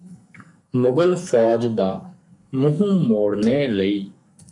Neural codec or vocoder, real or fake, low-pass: codec, 44.1 kHz, 2.6 kbps, SNAC; fake; 10.8 kHz